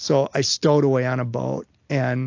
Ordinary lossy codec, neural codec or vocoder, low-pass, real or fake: AAC, 48 kbps; none; 7.2 kHz; real